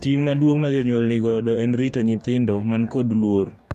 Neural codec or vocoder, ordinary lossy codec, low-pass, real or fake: codec, 44.1 kHz, 2.6 kbps, DAC; none; 14.4 kHz; fake